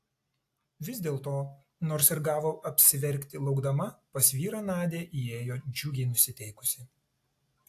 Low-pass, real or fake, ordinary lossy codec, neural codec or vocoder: 14.4 kHz; real; AAC, 64 kbps; none